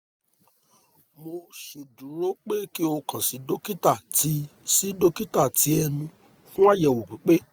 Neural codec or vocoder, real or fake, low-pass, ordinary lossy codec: none; real; none; none